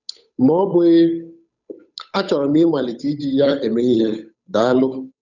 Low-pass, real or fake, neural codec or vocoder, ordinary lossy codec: 7.2 kHz; fake; codec, 16 kHz, 8 kbps, FunCodec, trained on Chinese and English, 25 frames a second; none